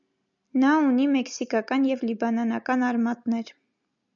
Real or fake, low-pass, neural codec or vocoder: real; 7.2 kHz; none